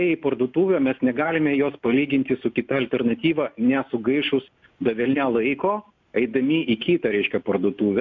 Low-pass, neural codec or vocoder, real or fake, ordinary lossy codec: 7.2 kHz; none; real; MP3, 64 kbps